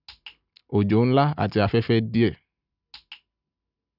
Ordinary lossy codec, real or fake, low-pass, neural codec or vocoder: none; real; 5.4 kHz; none